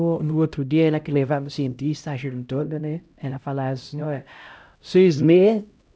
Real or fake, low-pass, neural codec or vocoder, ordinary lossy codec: fake; none; codec, 16 kHz, 0.5 kbps, X-Codec, HuBERT features, trained on LibriSpeech; none